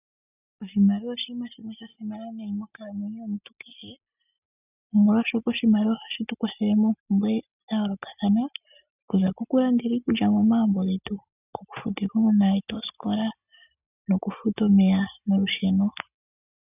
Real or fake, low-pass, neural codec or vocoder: real; 3.6 kHz; none